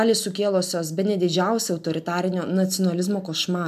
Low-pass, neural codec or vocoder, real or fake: 14.4 kHz; none; real